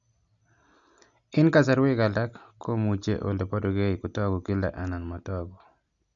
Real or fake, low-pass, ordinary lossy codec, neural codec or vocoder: real; 7.2 kHz; none; none